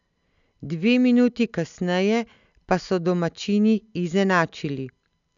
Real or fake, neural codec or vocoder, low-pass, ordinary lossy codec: real; none; 7.2 kHz; none